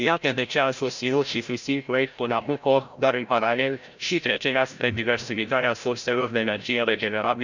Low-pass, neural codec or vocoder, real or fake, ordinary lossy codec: 7.2 kHz; codec, 16 kHz, 0.5 kbps, FreqCodec, larger model; fake; none